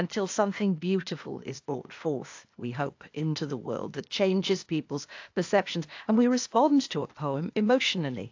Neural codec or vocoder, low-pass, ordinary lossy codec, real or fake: codec, 16 kHz, 0.8 kbps, ZipCodec; 7.2 kHz; AAC, 48 kbps; fake